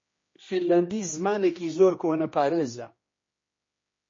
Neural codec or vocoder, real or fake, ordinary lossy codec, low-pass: codec, 16 kHz, 1 kbps, X-Codec, HuBERT features, trained on general audio; fake; MP3, 32 kbps; 7.2 kHz